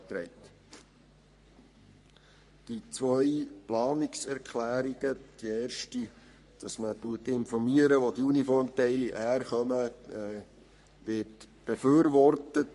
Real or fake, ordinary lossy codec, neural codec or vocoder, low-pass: fake; MP3, 48 kbps; codec, 44.1 kHz, 3.4 kbps, Pupu-Codec; 14.4 kHz